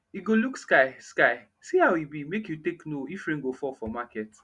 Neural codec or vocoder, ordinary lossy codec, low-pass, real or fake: none; Opus, 64 kbps; 10.8 kHz; real